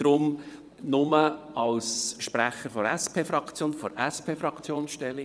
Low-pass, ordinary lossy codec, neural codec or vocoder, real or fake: none; none; vocoder, 22.05 kHz, 80 mel bands, WaveNeXt; fake